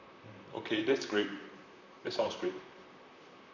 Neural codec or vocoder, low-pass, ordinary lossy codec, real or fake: vocoder, 44.1 kHz, 128 mel bands, Pupu-Vocoder; 7.2 kHz; none; fake